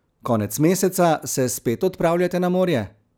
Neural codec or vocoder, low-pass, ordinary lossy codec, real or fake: none; none; none; real